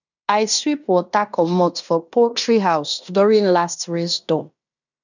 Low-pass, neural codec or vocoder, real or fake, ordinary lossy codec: 7.2 kHz; codec, 16 kHz in and 24 kHz out, 0.9 kbps, LongCat-Audio-Codec, fine tuned four codebook decoder; fake; none